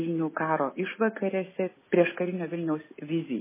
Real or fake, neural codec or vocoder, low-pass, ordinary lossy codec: real; none; 3.6 kHz; MP3, 16 kbps